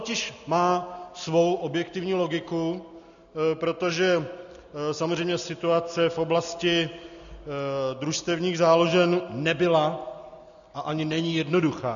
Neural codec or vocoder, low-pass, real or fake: none; 7.2 kHz; real